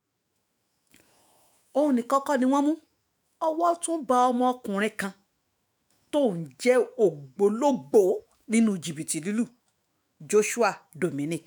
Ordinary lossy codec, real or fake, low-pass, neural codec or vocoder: none; fake; none; autoencoder, 48 kHz, 128 numbers a frame, DAC-VAE, trained on Japanese speech